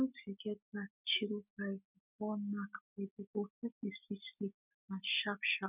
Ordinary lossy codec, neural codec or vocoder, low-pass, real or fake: none; none; 3.6 kHz; real